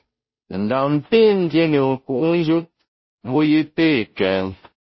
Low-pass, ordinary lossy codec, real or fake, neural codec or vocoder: 7.2 kHz; MP3, 24 kbps; fake; codec, 16 kHz, 0.5 kbps, FunCodec, trained on Chinese and English, 25 frames a second